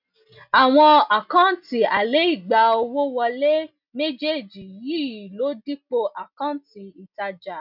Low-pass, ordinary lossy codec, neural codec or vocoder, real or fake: 5.4 kHz; none; none; real